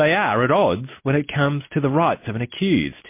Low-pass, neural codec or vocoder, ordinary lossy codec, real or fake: 3.6 kHz; none; MP3, 24 kbps; real